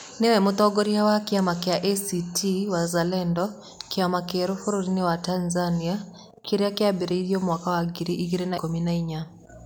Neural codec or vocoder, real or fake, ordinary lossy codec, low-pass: none; real; none; none